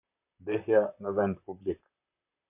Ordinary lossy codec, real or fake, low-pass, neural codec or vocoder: MP3, 32 kbps; fake; 3.6 kHz; vocoder, 44.1 kHz, 128 mel bands, Pupu-Vocoder